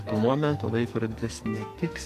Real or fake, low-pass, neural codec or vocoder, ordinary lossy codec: fake; 14.4 kHz; codec, 44.1 kHz, 2.6 kbps, SNAC; MP3, 96 kbps